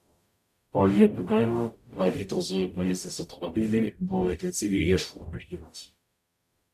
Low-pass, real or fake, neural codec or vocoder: 14.4 kHz; fake; codec, 44.1 kHz, 0.9 kbps, DAC